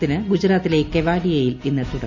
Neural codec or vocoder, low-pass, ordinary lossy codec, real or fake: none; 7.2 kHz; none; real